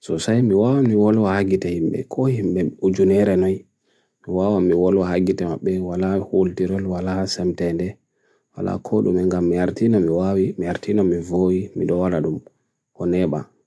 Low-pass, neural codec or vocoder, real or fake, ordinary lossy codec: none; none; real; none